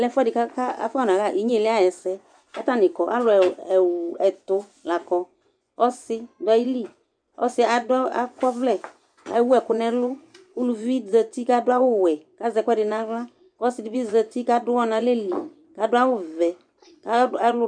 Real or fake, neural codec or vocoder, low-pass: fake; vocoder, 24 kHz, 100 mel bands, Vocos; 9.9 kHz